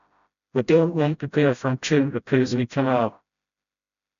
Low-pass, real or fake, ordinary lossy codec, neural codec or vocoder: 7.2 kHz; fake; none; codec, 16 kHz, 0.5 kbps, FreqCodec, smaller model